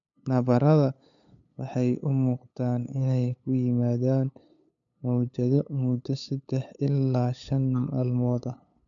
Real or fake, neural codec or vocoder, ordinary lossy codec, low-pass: fake; codec, 16 kHz, 8 kbps, FunCodec, trained on LibriTTS, 25 frames a second; none; 7.2 kHz